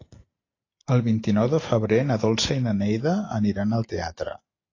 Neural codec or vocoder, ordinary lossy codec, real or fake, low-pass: none; AAC, 32 kbps; real; 7.2 kHz